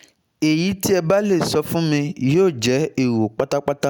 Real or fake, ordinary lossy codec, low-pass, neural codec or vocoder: real; none; none; none